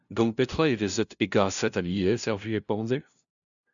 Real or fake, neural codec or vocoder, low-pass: fake; codec, 16 kHz, 0.5 kbps, FunCodec, trained on LibriTTS, 25 frames a second; 7.2 kHz